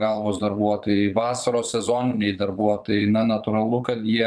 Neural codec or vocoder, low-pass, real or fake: vocoder, 22.05 kHz, 80 mel bands, Vocos; 9.9 kHz; fake